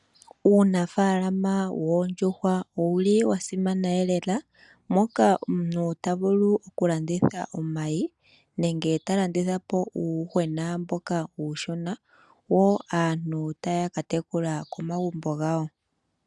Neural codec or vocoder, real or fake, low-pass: none; real; 10.8 kHz